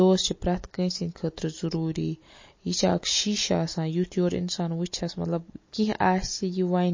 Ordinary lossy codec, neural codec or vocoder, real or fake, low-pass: MP3, 32 kbps; none; real; 7.2 kHz